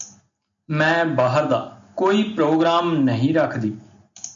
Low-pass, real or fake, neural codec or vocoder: 7.2 kHz; real; none